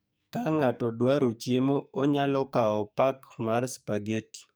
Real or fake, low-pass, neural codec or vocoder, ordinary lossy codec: fake; none; codec, 44.1 kHz, 2.6 kbps, SNAC; none